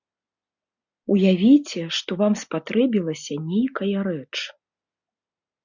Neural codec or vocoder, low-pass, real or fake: none; 7.2 kHz; real